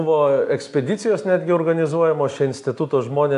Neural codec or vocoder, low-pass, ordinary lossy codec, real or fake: none; 10.8 kHz; AAC, 64 kbps; real